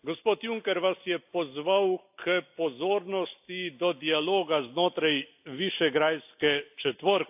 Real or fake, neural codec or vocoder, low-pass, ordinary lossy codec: real; none; 3.6 kHz; AAC, 32 kbps